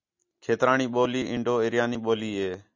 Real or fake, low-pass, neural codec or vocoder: real; 7.2 kHz; none